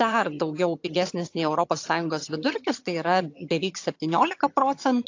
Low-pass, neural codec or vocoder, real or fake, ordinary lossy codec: 7.2 kHz; vocoder, 22.05 kHz, 80 mel bands, HiFi-GAN; fake; AAC, 48 kbps